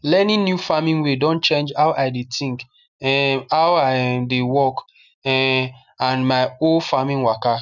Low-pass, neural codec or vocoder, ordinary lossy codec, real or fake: 7.2 kHz; none; none; real